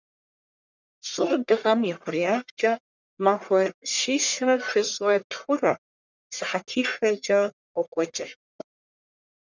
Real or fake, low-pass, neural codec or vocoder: fake; 7.2 kHz; codec, 44.1 kHz, 1.7 kbps, Pupu-Codec